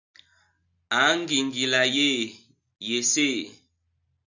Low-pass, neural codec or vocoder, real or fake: 7.2 kHz; none; real